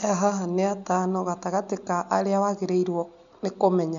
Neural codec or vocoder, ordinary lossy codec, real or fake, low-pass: none; none; real; 7.2 kHz